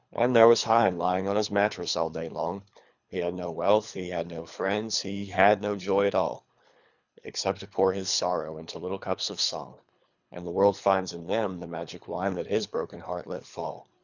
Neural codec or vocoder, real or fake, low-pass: codec, 24 kHz, 3 kbps, HILCodec; fake; 7.2 kHz